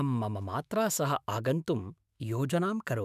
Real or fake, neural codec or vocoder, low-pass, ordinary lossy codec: fake; autoencoder, 48 kHz, 128 numbers a frame, DAC-VAE, trained on Japanese speech; 14.4 kHz; none